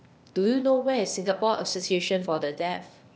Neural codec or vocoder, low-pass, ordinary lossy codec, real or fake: codec, 16 kHz, 0.8 kbps, ZipCodec; none; none; fake